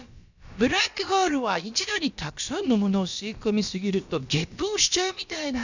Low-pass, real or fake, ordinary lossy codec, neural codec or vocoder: 7.2 kHz; fake; none; codec, 16 kHz, about 1 kbps, DyCAST, with the encoder's durations